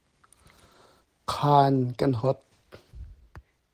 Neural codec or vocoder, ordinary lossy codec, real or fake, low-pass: none; Opus, 16 kbps; real; 14.4 kHz